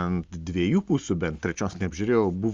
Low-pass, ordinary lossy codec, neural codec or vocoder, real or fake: 7.2 kHz; Opus, 32 kbps; none; real